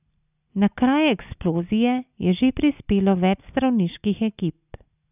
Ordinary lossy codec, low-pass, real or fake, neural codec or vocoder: none; 3.6 kHz; real; none